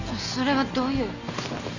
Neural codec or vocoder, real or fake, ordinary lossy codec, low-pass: none; real; none; 7.2 kHz